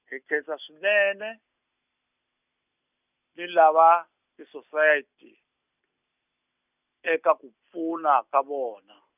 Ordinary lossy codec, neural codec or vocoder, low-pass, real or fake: none; none; 3.6 kHz; real